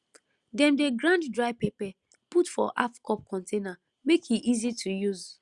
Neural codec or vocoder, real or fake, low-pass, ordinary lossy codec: none; real; 10.8 kHz; Opus, 64 kbps